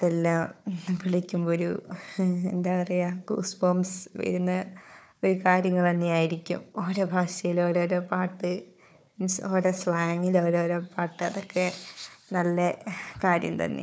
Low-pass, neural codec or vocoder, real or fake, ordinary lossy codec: none; codec, 16 kHz, 4 kbps, FunCodec, trained on Chinese and English, 50 frames a second; fake; none